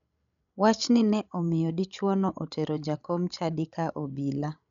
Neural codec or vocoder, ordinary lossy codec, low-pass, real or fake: codec, 16 kHz, 16 kbps, FreqCodec, larger model; none; 7.2 kHz; fake